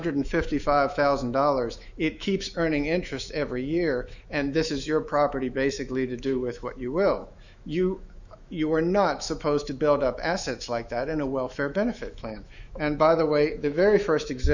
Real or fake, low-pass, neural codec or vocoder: fake; 7.2 kHz; autoencoder, 48 kHz, 128 numbers a frame, DAC-VAE, trained on Japanese speech